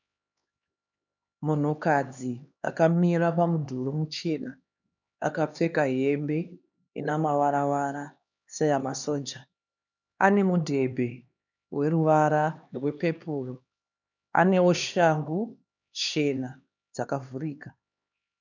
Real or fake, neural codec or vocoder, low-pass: fake; codec, 16 kHz, 2 kbps, X-Codec, HuBERT features, trained on LibriSpeech; 7.2 kHz